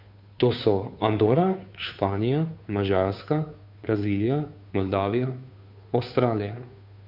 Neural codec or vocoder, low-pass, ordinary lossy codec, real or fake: codec, 16 kHz, 2 kbps, FunCodec, trained on Chinese and English, 25 frames a second; 5.4 kHz; none; fake